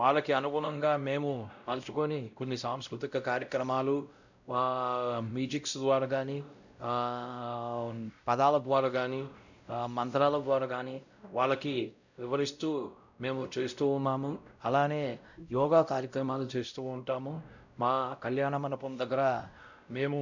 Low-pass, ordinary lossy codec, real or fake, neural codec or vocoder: 7.2 kHz; none; fake; codec, 16 kHz, 0.5 kbps, X-Codec, WavLM features, trained on Multilingual LibriSpeech